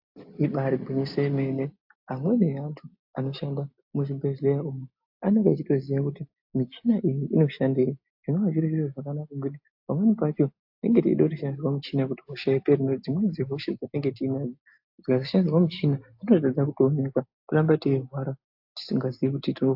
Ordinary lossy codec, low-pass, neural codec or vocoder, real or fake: AAC, 48 kbps; 5.4 kHz; none; real